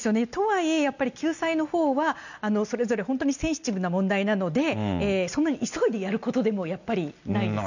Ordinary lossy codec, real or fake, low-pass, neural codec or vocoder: none; real; 7.2 kHz; none